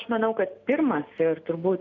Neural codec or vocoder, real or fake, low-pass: none; real; 7.2 kHz